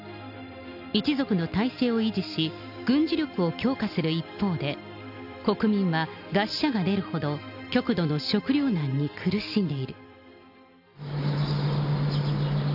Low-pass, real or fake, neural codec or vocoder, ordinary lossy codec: 5.4 kHz; real; none; none